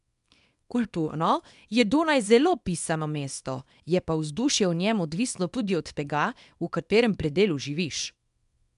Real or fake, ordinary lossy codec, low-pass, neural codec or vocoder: fake; none; 10.8 kHz; codec, 24 kHz, 0.9 kbps, WavTokenizer, small release